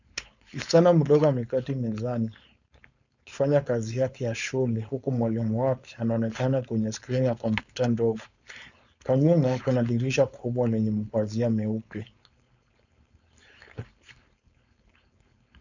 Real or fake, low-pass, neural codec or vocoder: fake; 7.2 kHz; codec, 16 kHz, 4.8 kbps, FACodec